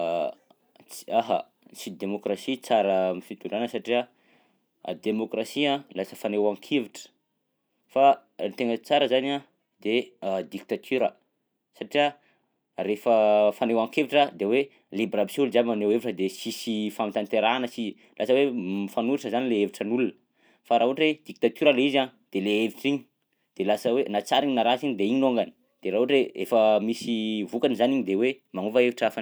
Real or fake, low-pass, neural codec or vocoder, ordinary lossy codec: real; none; none; none